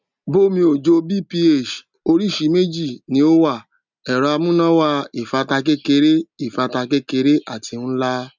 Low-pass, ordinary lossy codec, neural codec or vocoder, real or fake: 7.2 kHz; none; none; real